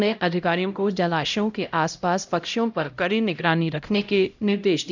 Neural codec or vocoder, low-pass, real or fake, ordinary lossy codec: codec, 16 kHz, 0.5 kbps, X-Codec, HuBERT features, trained on LibriSpeech; 7.2 kHz; fake; none